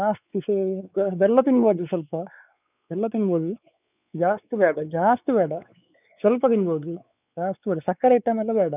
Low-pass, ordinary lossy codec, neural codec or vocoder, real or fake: 3.6 kHz; none; codec, 16 kHz, 4 kbps, X-Codec, WavLM features, trained on Multilingual LibriSpeech; fake